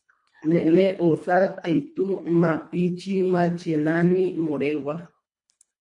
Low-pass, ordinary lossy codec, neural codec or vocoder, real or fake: 10.8 kHz; MP3, 48 kbps; codec, 24 kHz, 1.5 kbps, HILCodec; fake